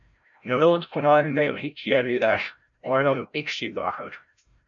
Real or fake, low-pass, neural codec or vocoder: fake; 7.2 kHz; codec, 16 kHz, 0.5 kbps, FreqCodec, larger model